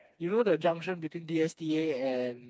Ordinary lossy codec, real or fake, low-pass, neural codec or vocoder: none; fake; none; codec, 16 kHz, 2 kbps, FreqCodec, smaller model